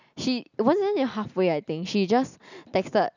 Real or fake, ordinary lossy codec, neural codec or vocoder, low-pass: real; none; none; 7.2 kHz